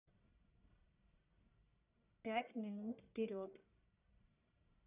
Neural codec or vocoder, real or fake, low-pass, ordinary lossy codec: codec, 44.1 kHz, 1.7 kbps, Pupu-Codec; fake; 3.6 kHz; none